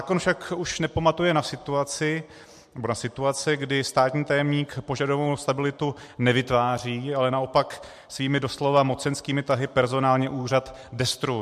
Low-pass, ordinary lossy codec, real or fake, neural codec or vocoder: 14.4 kHz; MP3, 64 kbps; real; none